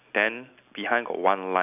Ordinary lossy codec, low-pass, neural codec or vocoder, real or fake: none; 3.6 kHz; none; real